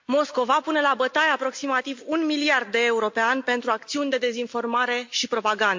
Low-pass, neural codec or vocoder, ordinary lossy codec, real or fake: 7.2 kHz; none; MP3, 48 kbps; real